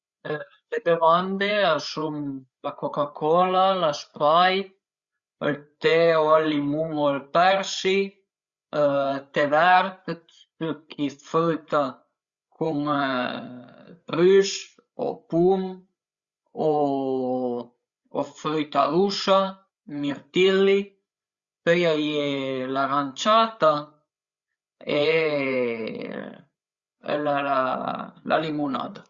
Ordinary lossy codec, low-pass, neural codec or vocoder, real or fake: Opus, 64 kbps; 7.2 kHz; codec, 16 kHz, 8 kbps, FreqCodec, larger model; fake